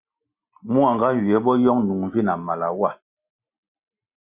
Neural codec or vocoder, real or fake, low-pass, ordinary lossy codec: none; real; 3.6 kHz; Opus, 64 kbps